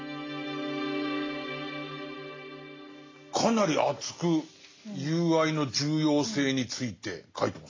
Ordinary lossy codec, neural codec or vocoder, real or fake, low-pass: AAC, 32 kbps; none; real; 7.2 kHz